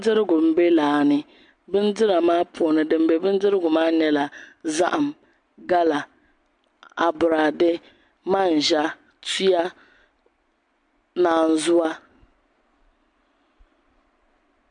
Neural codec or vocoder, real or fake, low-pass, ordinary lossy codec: none; real; 9.9 kHz; MP3, 64 kbps